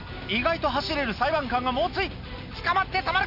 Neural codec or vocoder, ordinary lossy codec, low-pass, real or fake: none; none; 5.4 kHz; real